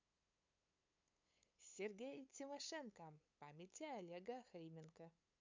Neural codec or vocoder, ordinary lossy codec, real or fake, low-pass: codec, 16 kHz, 8 kbps, FunCodec, trained on LibriTTS, 25 frames a second; MP3, 64 kbps; fake; 7.2 kHz